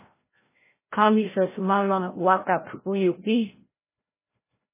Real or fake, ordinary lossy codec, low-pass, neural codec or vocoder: fake; MP3, 16 kbps; 3.6 kHz; codec, 16 kHz, 0.5 kbps, FreqCodec, larger model